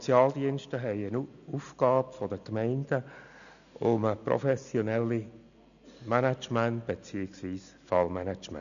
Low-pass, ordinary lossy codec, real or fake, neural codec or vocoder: 7.2 kHz; none; real; none